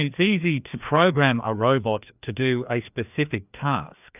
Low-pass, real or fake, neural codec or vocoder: 3.6 kHz; fake; codec, 16 kHz, 2 kbps, FreqCodec, larger model